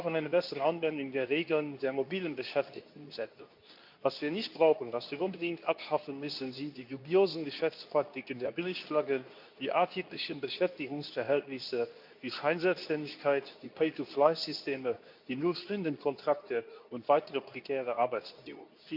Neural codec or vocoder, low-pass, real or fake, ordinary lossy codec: codec, 24 kHz, 0.9 kbps, WavTokenizer, medium speech release version 2; 5.4 kHz; fake; none